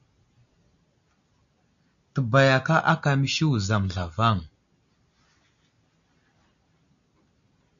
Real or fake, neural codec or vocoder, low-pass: real; none; 7.2 kHz